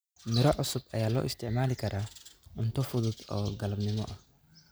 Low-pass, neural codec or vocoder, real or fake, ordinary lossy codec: none; none; real; none